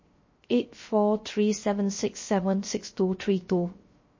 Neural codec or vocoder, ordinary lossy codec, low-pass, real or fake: codec, 16 kHz, 0.3 kbps, FocalCodec; MP3, 32 kbps; 7.2 kHz; fake